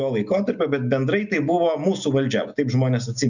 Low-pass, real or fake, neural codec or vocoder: 7.2 kHz; real; none